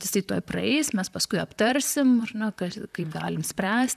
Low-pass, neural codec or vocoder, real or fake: 14.4 kHz; vocoder, 44.1 kHz, 128 mel bands, Pupu-Vocoder; fake